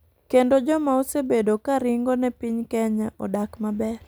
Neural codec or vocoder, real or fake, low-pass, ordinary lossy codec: none; real; none; none